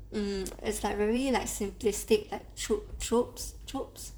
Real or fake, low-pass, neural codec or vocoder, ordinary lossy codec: fake; none; vocoder, 44.1 kHz, 128 mel bands, Pupu-Vocoder; none